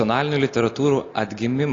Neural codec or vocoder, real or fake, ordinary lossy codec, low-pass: none; real; AAC, 32 kbps; 7.2 kHz